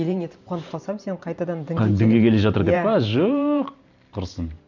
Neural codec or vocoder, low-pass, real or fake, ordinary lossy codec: vocoder, 44.1 kHz, 128 mel bands every 512 samples, BigVGAN v2; 7.2 kHz; fake; none